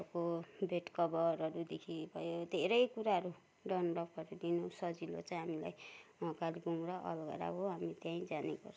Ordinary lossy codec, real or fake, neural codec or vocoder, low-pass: none; real; none; none